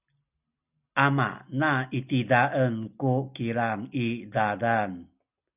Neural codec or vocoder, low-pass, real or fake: none; 3.6 kHz; real